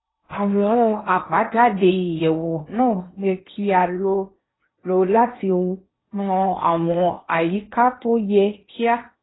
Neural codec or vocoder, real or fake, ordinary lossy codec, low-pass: codec, 16 kHz in and 24 kHz out, 0.8 kbps, FocalCodec, streaming, 65536 codes; fake; AAC, 16 kbps; 7.2 kHz